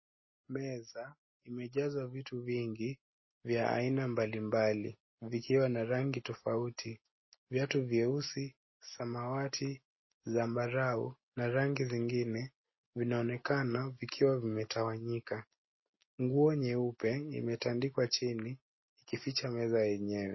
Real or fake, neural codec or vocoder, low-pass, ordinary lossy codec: real; none; 7.2 kHz; MP3, 24 kbps